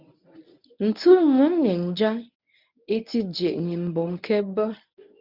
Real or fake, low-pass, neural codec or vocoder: fake; 5.4 kHz; codec, 24 kHz, 0.9 kbps, WavTokenizer, medium speech release version 1